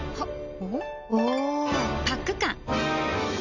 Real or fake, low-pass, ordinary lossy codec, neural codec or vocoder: real; 7.2 kHz; none; none